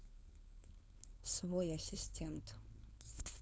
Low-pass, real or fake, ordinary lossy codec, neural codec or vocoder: none; fake; none; codec, 16 kHz, 4.8 kbps, FACodec